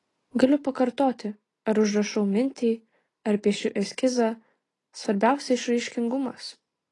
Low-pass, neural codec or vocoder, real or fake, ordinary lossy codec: 10.8 kHz; none; real; AAC, 32 kbps